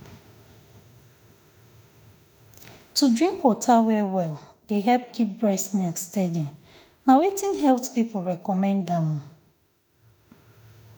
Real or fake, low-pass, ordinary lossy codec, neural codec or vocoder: fake; none; none; autoencoder, 48 kHz, 32 numbers a frame, DAC-VAE, trained on Japanese speech